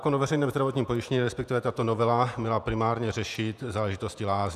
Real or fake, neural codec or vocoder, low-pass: fake; vocoder, 48 kHz, 128 mel bands, Vocos; 14.4 kHz